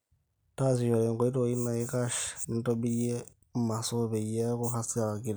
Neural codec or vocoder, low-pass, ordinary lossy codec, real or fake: none; none; none; real